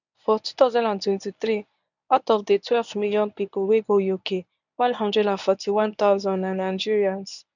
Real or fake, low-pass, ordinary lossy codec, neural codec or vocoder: fake; 7.2 kHz; none; codec, 24 kHz, 0.9 kbps, WavTokenizer, medium speech release version 1